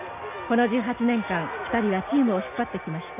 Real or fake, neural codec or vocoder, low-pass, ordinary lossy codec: real; none; 3.6 kHz; none